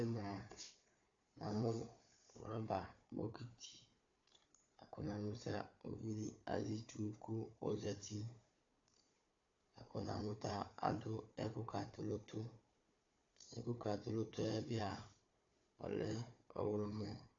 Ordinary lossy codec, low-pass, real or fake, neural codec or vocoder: AAC, 48 kbps; 7.2 kHz; fake; codec, 16 kHz, 4 kbps, FunCodec, trained on Chinese and English, 50 frames a second